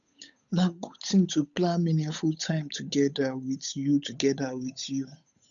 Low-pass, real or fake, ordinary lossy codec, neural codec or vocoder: 7.2 kHz; fake; none; codec, 16 kHz, 8 kbps, FunCodec, trained on Chinese and English, 25 frames a second